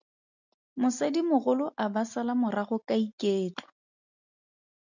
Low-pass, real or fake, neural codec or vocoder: 7.2 kHz; real; none